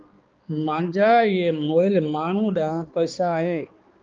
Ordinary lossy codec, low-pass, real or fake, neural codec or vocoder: Opus, 24 kbps; 7.2 kHz; fake; codec, 16 kHz, 2 kbps, X-Codec, HuBERT features, trained on balanced general audio